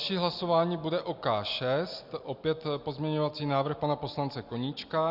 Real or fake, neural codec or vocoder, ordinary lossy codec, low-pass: real; none; Opus, 64 kbps; 5.4 kHz